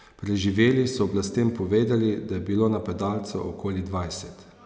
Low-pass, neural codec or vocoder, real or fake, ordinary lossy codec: none; none; real; none